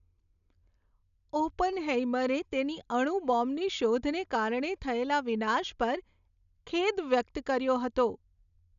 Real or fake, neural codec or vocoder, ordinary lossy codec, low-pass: real; none; none; 7.2 kHz